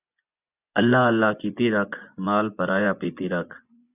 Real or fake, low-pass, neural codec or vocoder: fake; 3.6 kHz; vocoder, 24 kHz, 100 mel bands, Vocos